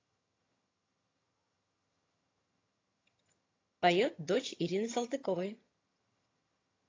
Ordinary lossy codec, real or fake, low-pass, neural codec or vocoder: AAC, 32 kbps; fake; 7.2 kHz; vocoder, 22.05 kHz, 80 mel bands, HiFi-GAN